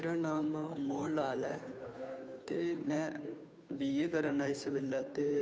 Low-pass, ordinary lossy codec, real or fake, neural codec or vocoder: none; none; fake; codec, 16 kHz, 2 kbps, FunCodec, trained on Chinese and English, 25 frames a second